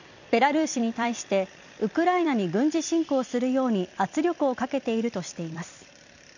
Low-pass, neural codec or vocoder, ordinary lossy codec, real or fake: 7.2 kHz; vocoder, 44.1 kHz, 128 mel bands every 256 samples, BigVGAN v2; none; fake